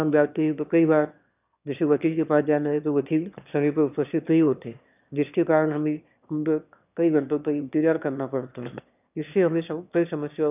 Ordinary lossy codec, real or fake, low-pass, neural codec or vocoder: none; fake; 3.6 kHz; autoencoder, 22.05 kHz, a latent of 192 numbers a frame, VITS, trained on one speaker